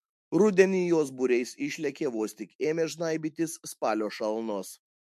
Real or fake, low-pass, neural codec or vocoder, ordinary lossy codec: fake; 14.4 kHz; autoencoder, 48 kHz, 128 numbers a frame, DAC-VAE, trained on Japanese speech; MP3, 64 kbps